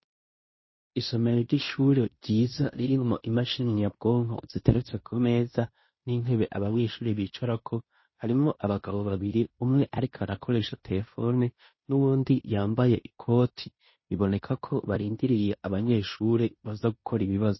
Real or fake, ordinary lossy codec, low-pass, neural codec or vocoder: fake; MP3, 24 kbps; 7.2 kHz; codec, 16 kHz in and 24 kHz out, 0.9 kbps, LongCat-Audio-Codec, fine tuned four codebook decoder